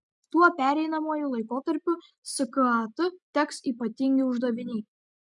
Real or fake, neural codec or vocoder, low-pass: real; none; 10.8 kHz